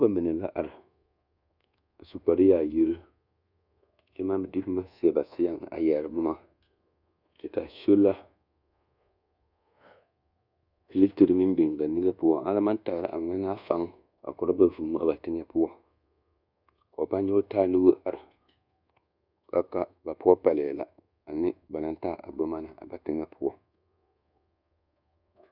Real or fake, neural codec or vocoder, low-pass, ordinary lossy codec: fake; codec, 24 kHz, 1.2 kbps, DualCodec; 5.4 kHz; Opus, 64 kbps